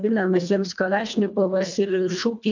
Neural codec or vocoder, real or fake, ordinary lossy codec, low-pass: codec, 24 kHz, 1.5 kbps, HILCodec; fake; MP3, 64 kbps; 7.2 kHz